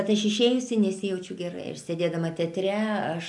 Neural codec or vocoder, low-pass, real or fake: none; 10.8 kHz; real